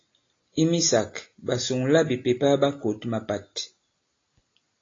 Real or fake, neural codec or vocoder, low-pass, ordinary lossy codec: real; none; 7.2 kHz; AAC, 32 kbps